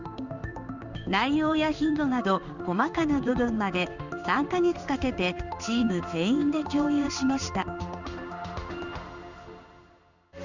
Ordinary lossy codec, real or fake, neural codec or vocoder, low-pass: none; fake; codec, 16 kHz in and 24 kHz out, 1 kbps, XY-Tokenizer; 7.2 kHz